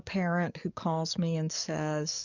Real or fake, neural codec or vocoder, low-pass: fake; codec, 44.1 kHz, 7.8 kbps, DAC; 7.2 kHz